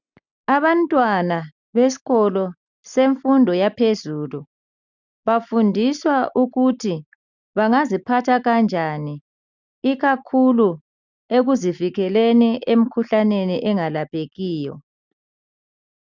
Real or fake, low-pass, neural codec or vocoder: real; 7.2 kHz; none